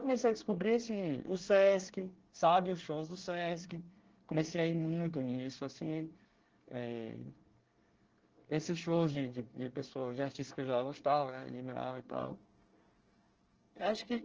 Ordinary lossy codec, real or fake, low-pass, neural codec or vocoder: Opus, 16 kbps; fake; 7.2 kHz; codec, 24 kHz, 1 kbps, SNAC